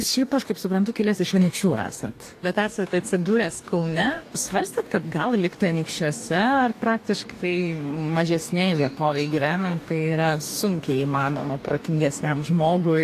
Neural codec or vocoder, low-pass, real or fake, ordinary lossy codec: codec, 44.1 kHz, 2.6 kbps, DAC; 14.4 kHz; fake; AAC, 64 kbps